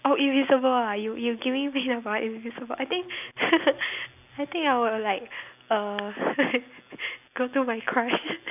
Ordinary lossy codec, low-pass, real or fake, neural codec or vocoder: none; 3.6 kHz; real; none